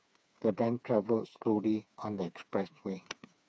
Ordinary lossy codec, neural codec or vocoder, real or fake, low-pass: none; codec, 16 kHz, 4 kbps, FreqCodec, smaller model; fake; none